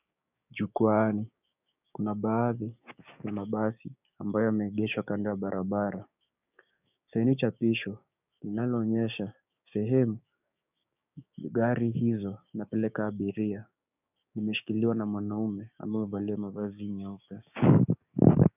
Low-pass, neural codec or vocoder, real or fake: 3.6 kHz; codec, 16 kHz, 6 kbps, DAC; fake